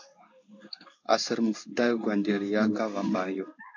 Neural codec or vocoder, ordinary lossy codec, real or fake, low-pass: codec, 16 kHz in and 24 kHz out, 1 kbps, XY-Tokenizer; AAC, 48 kbps; fake; 7.2 kHz